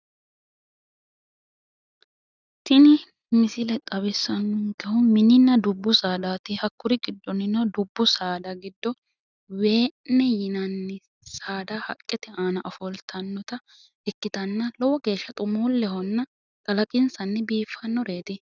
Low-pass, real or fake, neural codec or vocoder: 7.2 kHz; real; none